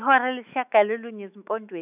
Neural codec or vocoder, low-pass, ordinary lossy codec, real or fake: autoencoder, 48 kHz, 128 numbers a frame, DAC-VAE, trained on Japanese speech; 3.6 kHz; none; fake